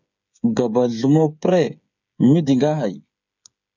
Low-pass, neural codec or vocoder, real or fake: 7.2 kHz; codec, 16 kHz, 8 kbps, FreqCodec, smaller model; fake